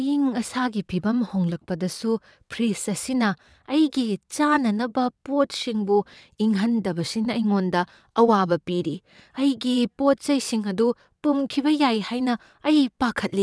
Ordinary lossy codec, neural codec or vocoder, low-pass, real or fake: none; vocoder, 22.05 kHz, 80 mel bands, WaveNeXt; none; fake